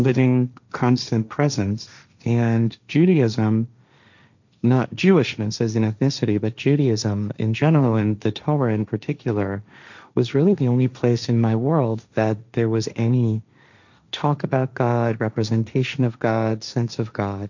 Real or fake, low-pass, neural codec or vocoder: fake; 7.2 kHz; codec, 16 kHz, 1.1 kbps, Voila-Tokenizer